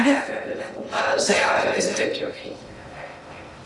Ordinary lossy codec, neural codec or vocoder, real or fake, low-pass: Opus, 64 kbps; codec, 16 kHz in and 24 kHz out, 0.6 kbps, FocalCodec, streaming, 2048 codes; fake; 10.8 kHz